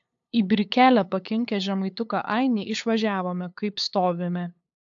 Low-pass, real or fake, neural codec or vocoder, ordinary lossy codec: 7.2 kHz; fake; codec, 16 kHz, 8 kbps, FunCodec, trained on LibriTTS, 25 frames a second; AAC, 64 kbps